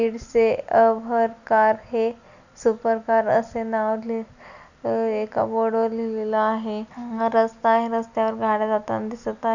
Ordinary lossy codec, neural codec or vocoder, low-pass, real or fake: none; none; 7.2 kHz; real